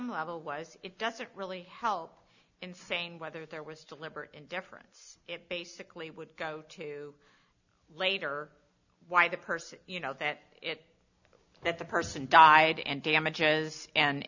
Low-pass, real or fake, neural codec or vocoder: 7.2 kHz; real; none